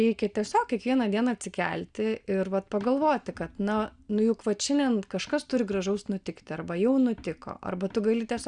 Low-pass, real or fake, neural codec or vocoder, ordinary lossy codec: 9.9 kHz; real; none; Opus, 64 kbps